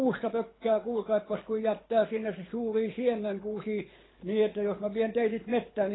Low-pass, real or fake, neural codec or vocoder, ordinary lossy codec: 7.2 kHz; fake; codec, 24 kHz, 6 kbps, HILCodec; AAC, 16 kbps